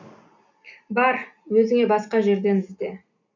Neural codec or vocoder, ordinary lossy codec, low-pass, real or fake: none; none; 7.2 kHz; real